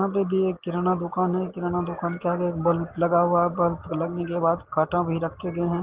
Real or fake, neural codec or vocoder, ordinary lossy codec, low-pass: real; none; Opus, 16 kbps; 3.6 kHz